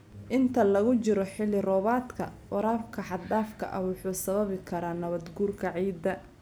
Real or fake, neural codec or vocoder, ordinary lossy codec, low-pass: real; none; none; none